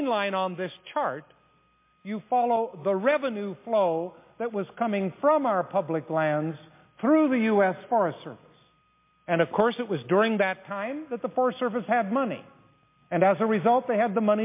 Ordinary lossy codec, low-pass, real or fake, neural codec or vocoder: MP3, 24 kbps; 3.6 kHz; real; none